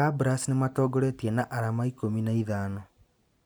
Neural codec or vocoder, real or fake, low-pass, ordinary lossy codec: none; real; none; none